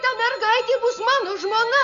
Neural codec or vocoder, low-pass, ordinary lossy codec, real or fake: none; 7.2 kHz; AAC, 64 kbps; real